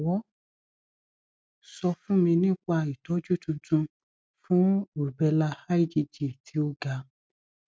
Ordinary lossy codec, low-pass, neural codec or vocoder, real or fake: none; none; none; real